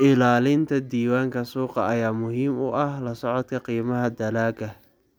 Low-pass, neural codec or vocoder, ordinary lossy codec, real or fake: none; none; none; real